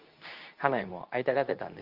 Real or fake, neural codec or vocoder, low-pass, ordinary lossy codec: fake; codec, 24 kHz, 0.9 kbps, WavTokenizer, medium speech release version 2; 5.4 kHz; Opus, 24 kbps